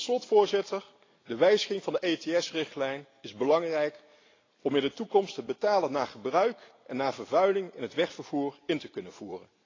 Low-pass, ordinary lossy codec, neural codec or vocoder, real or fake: 7.2 kHz; AAC, 32 kbps; none; real